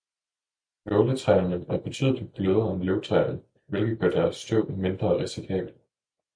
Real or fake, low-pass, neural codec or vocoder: real; 9.9 kHz; none